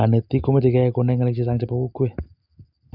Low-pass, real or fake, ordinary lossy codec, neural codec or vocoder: 5.4 kHz; real; none; none